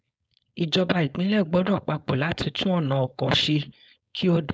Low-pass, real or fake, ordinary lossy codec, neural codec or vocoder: none; fake; none; codec, 16 kHz, 4.8 kbps, FACodec